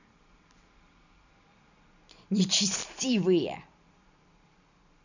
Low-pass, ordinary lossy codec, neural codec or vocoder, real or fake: 7.2 kHz; none; vocoder, 44.1 kHz, 128 mel bands every 256 samples, BigVGAN v2; fake